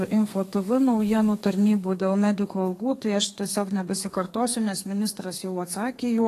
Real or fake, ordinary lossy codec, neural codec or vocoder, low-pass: fake; AAC, 48 kbps; codec, 32 kHz, 1.9 kbps, SNAC; 14.4 kHz